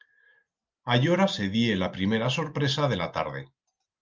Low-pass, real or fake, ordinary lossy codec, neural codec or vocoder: 7.2 kHz; real; Opus, 24 kbps; none